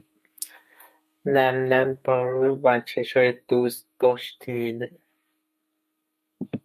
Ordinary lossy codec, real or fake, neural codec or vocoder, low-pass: MP3, 64 kbps; fake; codec, 32 kHz, 1.9 kbps, SNAC; 14.4 kHz